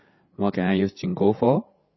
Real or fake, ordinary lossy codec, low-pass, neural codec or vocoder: fake; MP3, 24 kbps; 7.2 kHz; codec, 16 kHz, 8 kbps, FreqCodec, larger model